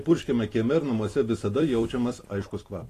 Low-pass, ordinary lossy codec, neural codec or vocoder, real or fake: 14.4 kHz; AAC, 48 kbps; vocoder, 44.1 kHz, 128 mel bands every 256 samples, BigVGAN v2; fake